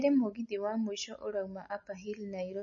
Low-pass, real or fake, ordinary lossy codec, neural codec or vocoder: 7.2 kHz; real; MP3, 32 kbps; none